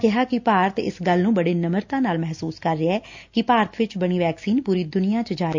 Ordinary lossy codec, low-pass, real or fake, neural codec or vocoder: MP3, 48 kbps; 7.2 kHz; real; none